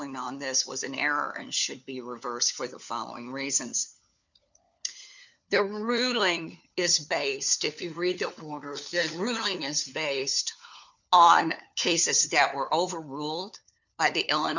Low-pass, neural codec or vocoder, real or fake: 7.2 kHz; codec, 16 kHz, 4 kbps, FunCodec, trained on LibriTTS, 50 frames a second; fake